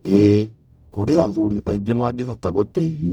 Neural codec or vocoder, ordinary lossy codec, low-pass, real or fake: codec, 44.1 kHz, 0.9 kbps, DAC; none; 19.8 kHz; fake